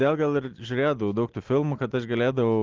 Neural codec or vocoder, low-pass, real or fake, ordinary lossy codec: none; 7.2 kHz; real; Opus, 16 kbps